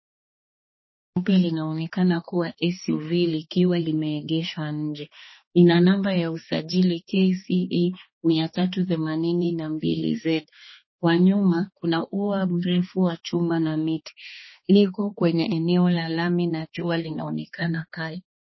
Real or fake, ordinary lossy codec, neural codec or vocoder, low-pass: fake; MP3, 24 kbps; codec, 16 kHz, 2 kbps, X-Codec, HuBERT features, trained on balanced general audio; 7.2 kHz